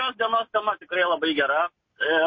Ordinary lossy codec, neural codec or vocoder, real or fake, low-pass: MP3, 32 kbps; none; real; 7.2 kHz